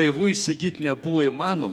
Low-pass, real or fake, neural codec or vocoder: 19.8 kHz; fake; codec, 44.1 kHz, 2.6 kbps, DAC